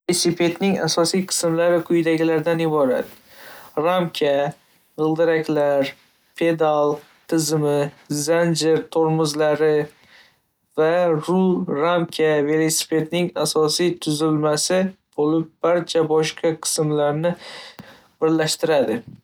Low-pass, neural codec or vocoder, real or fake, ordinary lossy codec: none; none; real; none